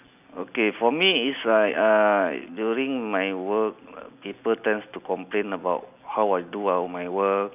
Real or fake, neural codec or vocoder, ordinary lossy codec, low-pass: real; none; none; 3.6 kHz